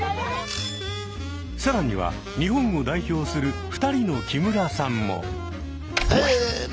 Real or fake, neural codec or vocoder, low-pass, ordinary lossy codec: real; none; none; none